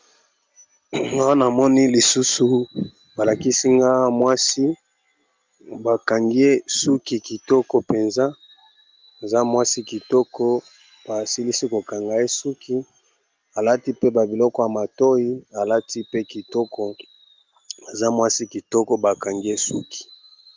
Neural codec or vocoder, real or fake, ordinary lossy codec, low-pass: none; real; Opus, 32 kbps; 7.2 kHz